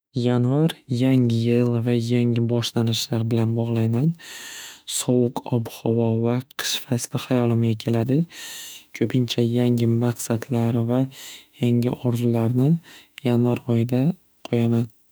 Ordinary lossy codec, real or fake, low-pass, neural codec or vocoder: none; fake; none; autoencoder, 48 kHz, 32 numbers a frame, DAC-VAE, trained on Japanese speech